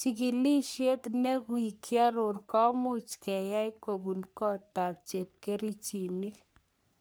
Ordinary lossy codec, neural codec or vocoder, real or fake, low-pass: none; codec, 44.1 kHz, 3.4 kbps, Pupu-Codec; fake; none